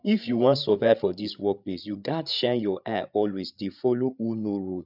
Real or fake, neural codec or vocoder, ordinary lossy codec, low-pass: fake; codec, 16 kHz, 8 kbps, FreqCodec, larger model; none; 5.4 kHz